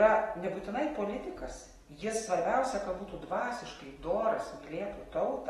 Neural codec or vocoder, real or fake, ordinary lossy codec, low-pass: none; real; AAC, 32 kbps; 19.8 kHz